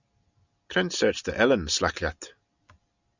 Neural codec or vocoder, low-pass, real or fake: none; 7.2 kHz; real